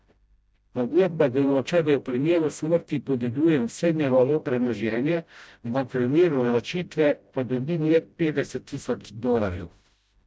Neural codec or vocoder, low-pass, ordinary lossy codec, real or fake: codec, 16 kHz, 0.5 kbps, FreqCodec, smaller model; none; none; fake